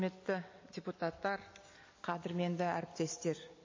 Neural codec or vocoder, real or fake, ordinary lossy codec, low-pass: vocoder, 44.1 kHz, 128 mel bands every 256 samples, BigVGAN v2; fake; MP3, 32 kbps; 7.2 kHz